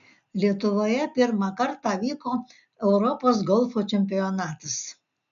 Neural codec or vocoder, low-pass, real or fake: none; 7.2 kHz; real